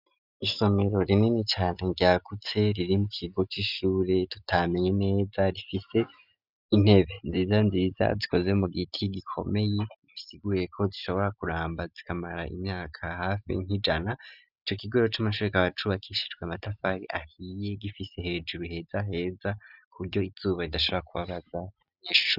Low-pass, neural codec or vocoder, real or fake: 5.4 kHz; none; real